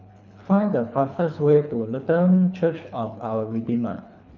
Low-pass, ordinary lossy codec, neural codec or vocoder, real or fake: 7.2 kHz; none; codec, 24 kHz, 3 kbps, HILCodec; fake